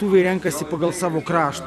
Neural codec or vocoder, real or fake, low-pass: vocoder, 44.1 kHz, 128 mel bands every 256 samples, BigVGAN v2; fake; 14.4 kHz